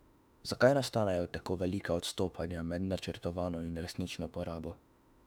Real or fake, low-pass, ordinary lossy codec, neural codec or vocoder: fake; 19.8 kHz; none; autoencoder, 48 kHz, 32 numbers a frame, DAC-VAE, trained on Japanese speech